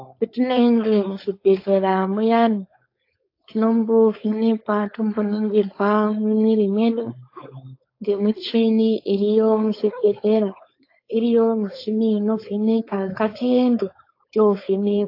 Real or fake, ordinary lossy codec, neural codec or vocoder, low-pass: fake; AAC, 32 kbps; codec, 16 kHz, 4.8 kbps, FACodec; 5.4 kHz